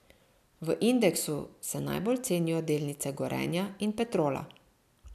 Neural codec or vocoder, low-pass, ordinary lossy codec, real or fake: none; 14.4 kHz; none; real